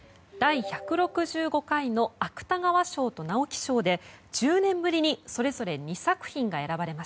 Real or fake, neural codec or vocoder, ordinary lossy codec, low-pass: real; none; none; none